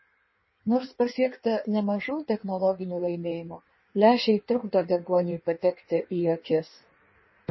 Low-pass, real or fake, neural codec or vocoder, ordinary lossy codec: 7.2 kHz; fake; codec, 16 kHz in and 24 kHz out, 1.1 kbps, FireRedTTS-2 codec; MP3, 24 kbps